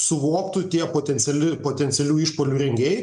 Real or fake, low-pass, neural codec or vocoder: real; 10.8 kHz; none